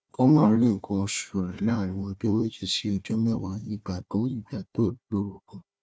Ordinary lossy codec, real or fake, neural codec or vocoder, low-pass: none; fake; codec, 16 kHz, 1 kbps, FunCodec, trained on Chinese and English, 50 frames a second; none